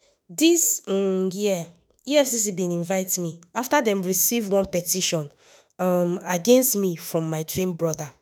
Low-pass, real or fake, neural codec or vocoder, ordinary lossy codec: none; fake; autoencoder, 48 kHz, 32 numbers a frame, DAC-VAE, trained on Japanese speech; none